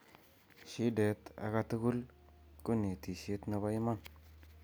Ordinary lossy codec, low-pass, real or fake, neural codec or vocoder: none; none; real; none